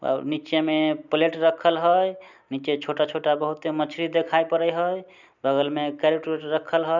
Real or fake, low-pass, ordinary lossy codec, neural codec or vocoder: real; 7.2 kHz; none; none